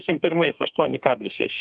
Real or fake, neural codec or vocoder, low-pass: fake; codec, 32 kHz, 1.9 kbps, SNAC; 9.9 kHz